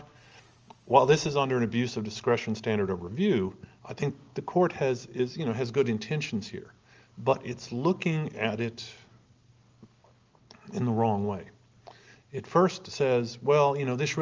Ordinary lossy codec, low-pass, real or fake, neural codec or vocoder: Opus, 24 kbps; 7.2 kHz; real; none